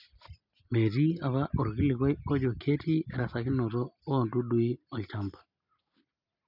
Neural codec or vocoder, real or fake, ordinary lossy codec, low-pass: none; real; none; 5.4 kHz